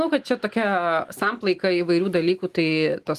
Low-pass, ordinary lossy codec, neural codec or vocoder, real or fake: 14.4 kHz; Opus, 24 kbps; none; real